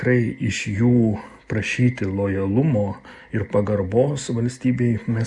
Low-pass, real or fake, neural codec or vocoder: 10.8 kHz; real; none